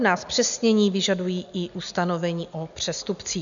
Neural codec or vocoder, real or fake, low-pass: none; real; 7.2 kHz